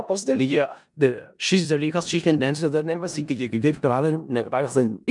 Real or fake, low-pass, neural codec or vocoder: fake; 10.8 kHz; codec, 16 kHz in and 24 kHz out, 0.4 kbps, LongCat-Audio-Codec, four codebook decoder